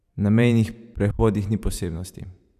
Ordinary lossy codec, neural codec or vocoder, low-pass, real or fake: none; vocoder, 44.1 kHz, 128 mel bands every 512 samples, BigVGAN v2; 14.4 kHz; fake